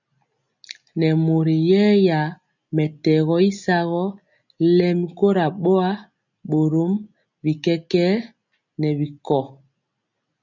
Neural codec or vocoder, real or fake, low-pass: none; real; 7.2 kHz